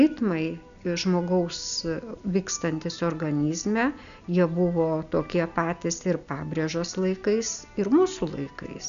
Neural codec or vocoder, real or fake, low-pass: none; real; 7.2 kHz